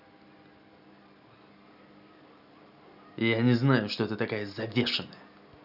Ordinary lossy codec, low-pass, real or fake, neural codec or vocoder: none; 5.4 kHz; real; none